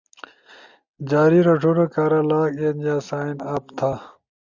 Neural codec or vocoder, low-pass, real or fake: none; 7.2 kHz; real